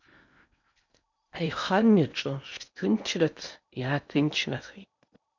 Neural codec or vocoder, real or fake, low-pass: codec, 16 kHz in and 24 kHz out, 0.8 kbps, FocalCodec, streaming, 65536 codes; fake; 7.2 kHz